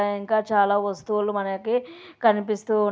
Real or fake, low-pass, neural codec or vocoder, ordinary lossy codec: real; none; none; none